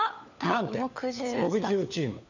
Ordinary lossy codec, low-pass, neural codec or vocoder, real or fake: none; 7.2 kHz; codec, 16 kHz, 4 kbps, FunCodec, trained on LibriTTS, 50 frames a second; fake